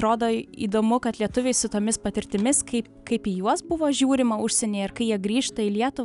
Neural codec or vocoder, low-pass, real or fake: none; 10.8 kHz; real